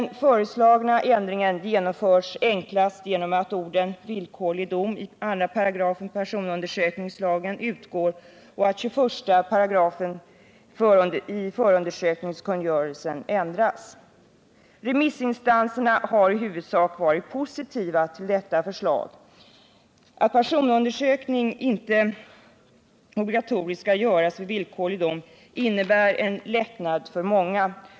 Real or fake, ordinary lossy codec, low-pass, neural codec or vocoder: real; none; none; none